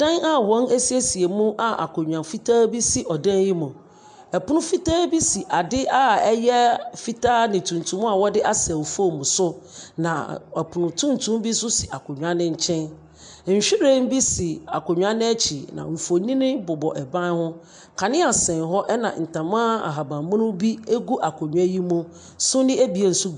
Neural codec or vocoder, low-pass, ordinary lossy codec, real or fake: none; 9.9 kHz; MP3, 64 kbps; real